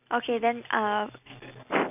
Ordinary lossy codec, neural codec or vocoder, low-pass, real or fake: AAC, 32 kbps; none; 3.6 kHz; real